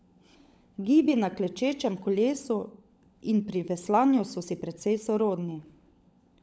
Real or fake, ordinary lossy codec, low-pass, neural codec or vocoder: fake; none; none; codec, 16 kHz, 16 kbps, FunCodec, trained on LibriTTS, 50 frames a second